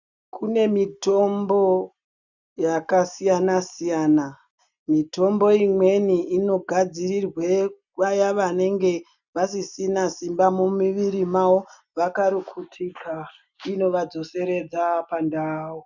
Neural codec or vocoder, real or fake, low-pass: none; real; 7.2 kHz